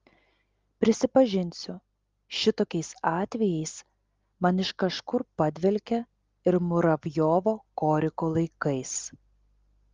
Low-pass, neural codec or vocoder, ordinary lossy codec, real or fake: 7.2 kHz; none; Opus, 32 kbps; real